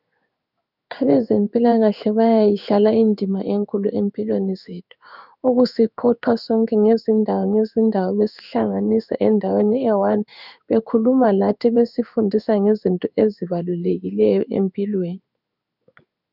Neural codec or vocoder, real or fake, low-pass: codec, 16 kHz in and 24 kHz out, 1 kbps, XY-Tokenizer; fake; 5.4 kHz